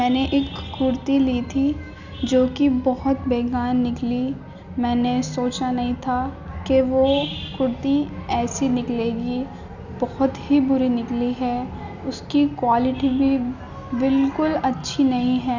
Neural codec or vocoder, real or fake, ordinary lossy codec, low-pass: none; real; none; 7.2 kHz